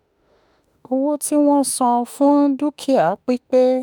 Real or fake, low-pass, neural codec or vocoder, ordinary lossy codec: fake; none; autoencoder, 48 kHz, 32 numbers a frame, DAC-VAE, trained on Japanese speech; none